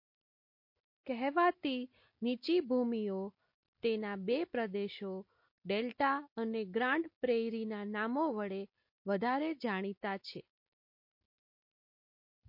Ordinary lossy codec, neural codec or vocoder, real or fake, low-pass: MP3, 32 kbps; none; real; 5.4 kHz